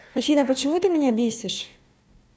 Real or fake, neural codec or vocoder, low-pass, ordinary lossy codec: fake; codec, 16 kHz, 1 kbps, FunCodec, trained on Chinese and English, 50 frames a second; none; none